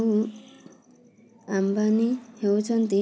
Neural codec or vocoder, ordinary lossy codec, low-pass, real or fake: none; none; none; real